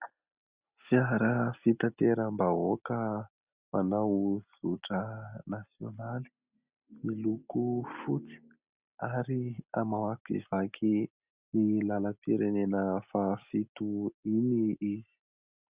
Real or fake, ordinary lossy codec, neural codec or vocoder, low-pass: real; Opus, 64 kbps; none; 3.6 kHz